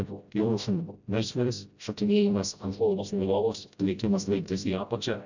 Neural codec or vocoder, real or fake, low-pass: codec, 16 kHz, 0.5 kbps, FreqCodec, smaller model; fake; 7.2 kHz